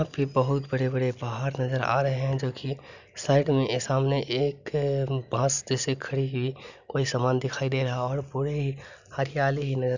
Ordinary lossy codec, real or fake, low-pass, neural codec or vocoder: none; real; 7.2 kHz; none